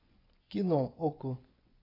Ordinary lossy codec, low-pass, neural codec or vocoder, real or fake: AAC, 24 kbps; 5.4 kHz; vocoder, 24 kHz, 100 mel bands, Vocos; fake